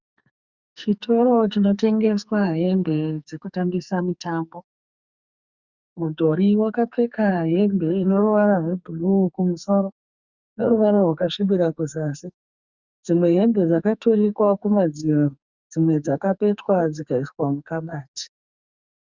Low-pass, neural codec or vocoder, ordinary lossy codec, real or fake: 7.2 kHz; codec, 44.1 kHz, 2.6 kbps, SNAC; Opus, 64 kbps; fake